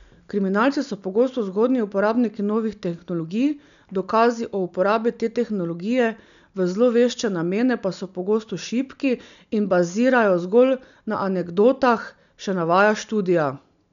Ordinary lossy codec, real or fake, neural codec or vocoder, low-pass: none; real; none; 7.2 kHz